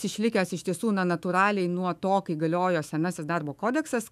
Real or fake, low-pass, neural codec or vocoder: fake; 14.4 kHz; autoencoder, 48 kHz, 128 numbers a frame, DAC-VAE, trained on Japanese speech